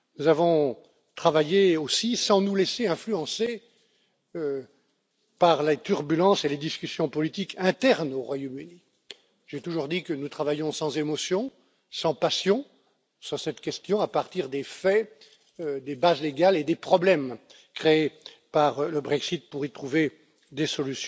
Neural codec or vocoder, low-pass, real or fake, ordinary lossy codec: none; none; real; none